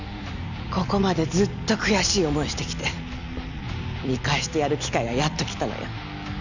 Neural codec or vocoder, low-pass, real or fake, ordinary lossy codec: none; 7.2 kHz; real; none